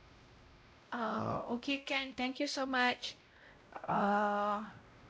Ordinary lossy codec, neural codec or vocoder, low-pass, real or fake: none; codec, 16 kHz, 0.5 kbps, X-Codec, WavLM features, trained on Multilingual LibriSpeech; none; fake